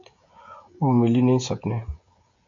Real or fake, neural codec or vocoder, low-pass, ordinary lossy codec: fake; codec, 16 kHz, 16 kbps, FreqCodec, smaller model; 7.2 kHz; AAC, 64 kbps